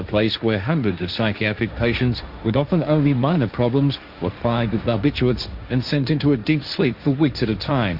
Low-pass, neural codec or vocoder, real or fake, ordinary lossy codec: 5.4 kHz; codec, 16 kHz, 1.1 kbps, Voila-Tokenizer; fake; Opus, 64 kbps